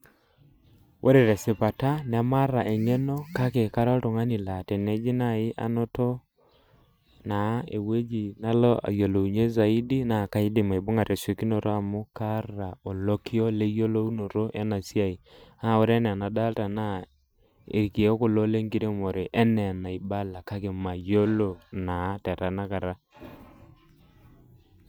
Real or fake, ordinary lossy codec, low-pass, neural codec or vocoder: real; none; none; none